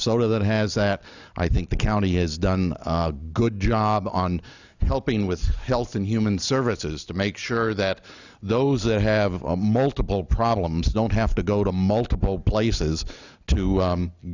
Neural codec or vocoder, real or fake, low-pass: none; real; 7.2 kHz